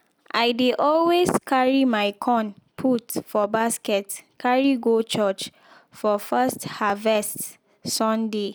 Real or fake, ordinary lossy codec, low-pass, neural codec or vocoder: real; none; none; none